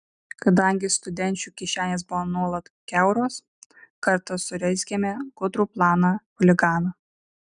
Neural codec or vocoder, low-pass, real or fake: none; 9.9 kHz; real